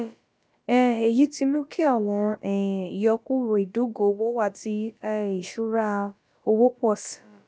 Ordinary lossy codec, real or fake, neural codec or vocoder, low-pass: none; fake; codec, 16 kHz, about 1 kbps, DyCAST, with the encoder's durations; none